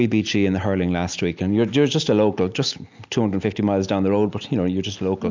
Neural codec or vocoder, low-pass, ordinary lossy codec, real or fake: none; 7.2 kHz; MP3, 64 kbps; real